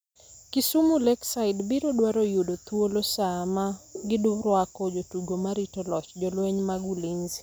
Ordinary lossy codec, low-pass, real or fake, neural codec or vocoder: none; none; real; none